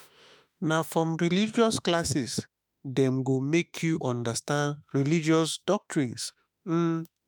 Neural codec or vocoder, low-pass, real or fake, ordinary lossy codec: autoencoder, 48 kHz, 32 numbers a frame, DAC-VAE, trained on Japanese speech; none; fake; none